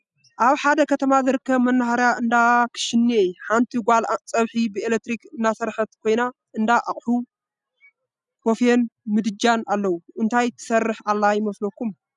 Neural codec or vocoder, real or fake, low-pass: none; real; 10.8 kHz